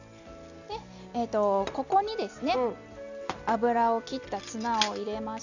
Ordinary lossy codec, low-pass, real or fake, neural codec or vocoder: none; 7.2 kHz; real; none